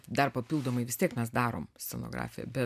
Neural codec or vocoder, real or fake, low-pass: none; real; 14.4 kHz